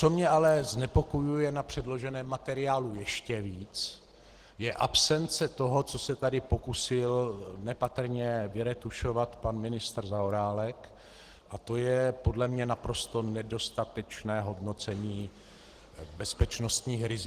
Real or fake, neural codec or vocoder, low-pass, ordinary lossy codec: real; none; 14.4 kHz; Opus, 16 kbps